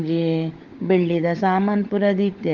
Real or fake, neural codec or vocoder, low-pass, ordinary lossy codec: fake; codec, 16 kHz, 16 kbps, FunCodec, trained on LibriTTS, 50 frames a second; 7.2 kHz; Opus, 16 kbps